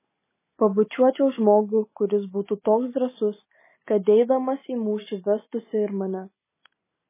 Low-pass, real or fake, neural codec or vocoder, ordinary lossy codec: 3.6 kHz; real; none; MP3, 16 kbps